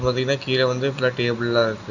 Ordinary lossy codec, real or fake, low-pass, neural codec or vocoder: none; real; 7.2 kHz; none